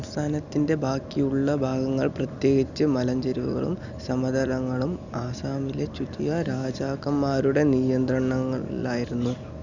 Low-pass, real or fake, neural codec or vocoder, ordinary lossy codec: 7.2 kHz; real; none; none